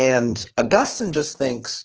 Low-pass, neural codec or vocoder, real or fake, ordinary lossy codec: 7.2 kHz; codec, 16 kHz, 2 kbps, FreqCodec, larger model; fake; Opus, 16 kbps